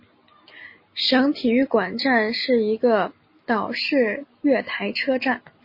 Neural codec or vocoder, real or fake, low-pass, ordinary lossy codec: none; real; 5.4 kHz; MP3, 24 kbps